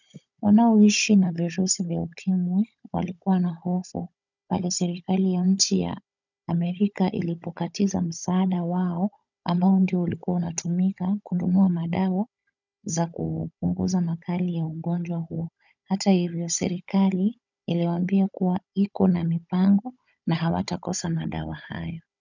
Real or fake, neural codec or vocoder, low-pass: fake; codec, 16 kHz, 16 kbps, FunCodec, trained on Chinese and English, 50 frames a second; 7.2 kHz